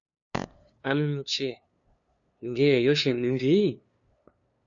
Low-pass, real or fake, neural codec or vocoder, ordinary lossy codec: 7.2 kHz; fake; codec, 16 kHz, 2 kbps, FunCodec, trained on LibriTTS, 25 frames a second; MP3, 96 kbps